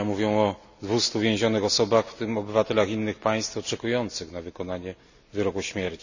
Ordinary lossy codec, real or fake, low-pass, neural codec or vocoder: none; real; 7.2 kHz; none